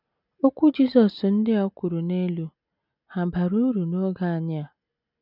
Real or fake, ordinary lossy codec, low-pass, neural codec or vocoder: real; none; 5.4 kHz; none